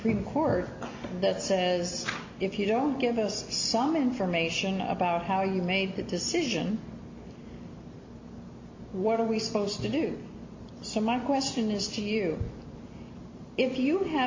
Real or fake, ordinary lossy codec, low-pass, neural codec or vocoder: real; MP3, 48 kbps; 7.2 kHz; none